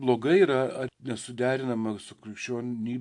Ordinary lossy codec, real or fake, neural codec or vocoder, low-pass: AAC, 64 kbps; real; none; 10.8 kHz